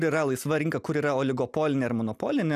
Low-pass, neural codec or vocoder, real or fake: 14.4 kHz; none; real